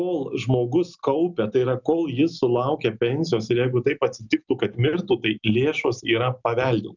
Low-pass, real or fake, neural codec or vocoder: 7.2 kHz; real; none